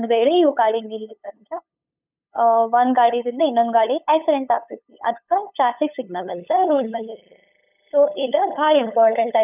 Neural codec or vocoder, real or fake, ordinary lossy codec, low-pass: codec, 16 kHz, 8 kbps, FunCodec, trained on LibriTTS, 25 frames a second; fake; none; 3.6 kHz